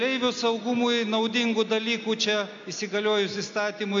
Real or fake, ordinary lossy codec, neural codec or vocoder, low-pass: real; AAC, 64 kbps; none; 7.2 kHz